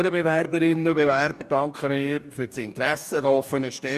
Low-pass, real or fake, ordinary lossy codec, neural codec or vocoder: 14.4 kHz; fake; none; codec, 44.1 kHz, 2.6 kbps, DAC